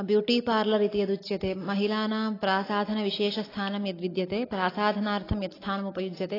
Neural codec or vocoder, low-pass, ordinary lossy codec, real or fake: none; 5.4 kHz; AAC, 24 kbps; real